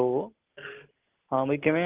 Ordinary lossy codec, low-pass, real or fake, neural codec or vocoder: Opus, 16 kbps; 3.6 kHz; real; none